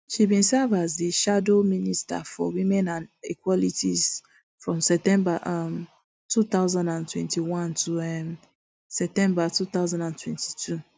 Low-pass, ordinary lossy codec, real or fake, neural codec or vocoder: none; none; real; none